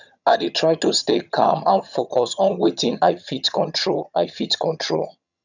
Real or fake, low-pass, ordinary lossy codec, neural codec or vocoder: fake; 7.2 kHz; none; vocoder, 22.05 kHz, 80 mel bands, HiFi-GAN